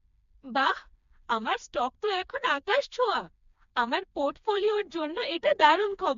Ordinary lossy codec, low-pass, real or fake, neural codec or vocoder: MP3, 64 kbps; 7.2 kHz; fake; codec, 16 kHz, 2 kbps, FreqCodec, smaller model